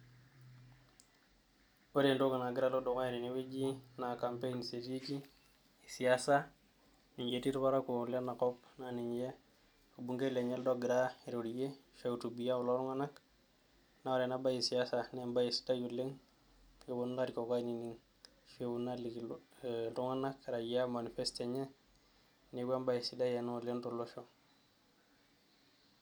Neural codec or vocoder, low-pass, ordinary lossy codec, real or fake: none; none; none; real